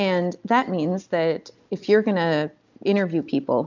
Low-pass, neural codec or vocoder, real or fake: 7.2 kHz; none; real